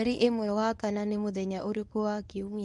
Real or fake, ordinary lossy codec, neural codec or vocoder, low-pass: fake; none; codec, 24 kHz, 0.9 kbps, WavTokenizer, medium speech release version 1; 10.8 kHz